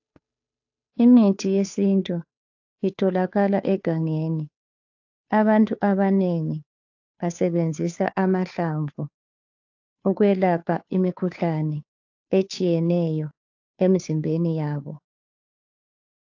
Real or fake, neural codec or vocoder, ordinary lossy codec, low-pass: fake; codec, 16 kHz, 2 kbps, FunCodec, trained on Chinese and English, 25 frames a second; AAC, 48 kbps; 7.2 kHz